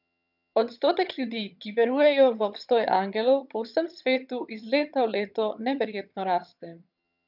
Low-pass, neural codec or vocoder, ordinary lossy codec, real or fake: 5.4 kHz; vocoder, 22.05 kHz, 80 mel bands, HiFi-GAN; none; fake